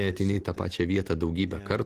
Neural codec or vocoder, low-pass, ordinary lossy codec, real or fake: none; 14.4 kHz; Opus, 16 kbps; real